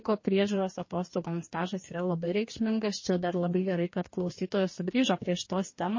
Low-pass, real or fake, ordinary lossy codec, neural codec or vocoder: 7.2 kHz; fake; MP3, 32 kbps; codec, 44.1 kHz, 2.6 kbps, DAC